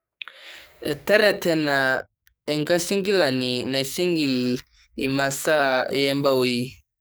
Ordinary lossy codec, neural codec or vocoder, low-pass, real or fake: none; codec, 44.1 kHz, 2.6 kbps, SNAC; none; fake